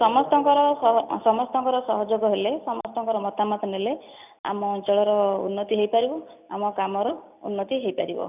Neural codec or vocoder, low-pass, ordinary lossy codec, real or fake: none; 3.6 kHz; none; real